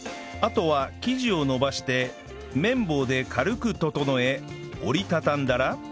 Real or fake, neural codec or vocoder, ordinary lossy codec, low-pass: real; none; none; none